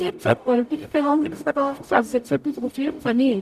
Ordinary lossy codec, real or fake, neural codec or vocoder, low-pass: none; fake; codec, 44.1 kHz, 0.9 kbps, DAC; 14.4 kHz